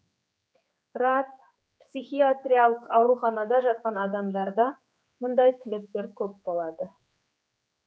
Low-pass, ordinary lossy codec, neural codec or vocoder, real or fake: none; none; codec, 16 kHz, 4 kbps, X-Codec, HuBERT features, trained on general audio; fake